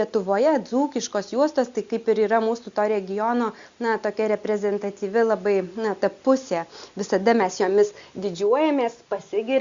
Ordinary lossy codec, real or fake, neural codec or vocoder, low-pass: Opus, 64 kbps; real; none; 7.2 kHz